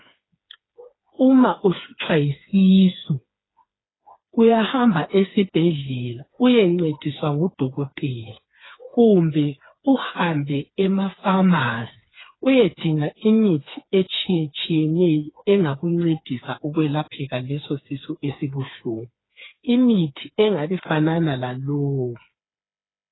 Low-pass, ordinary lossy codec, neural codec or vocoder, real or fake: 7.2 kHz; AAC, 16 kbps; codec, 16 kHz, 4 kbps, FreqCodec, smaller model; fake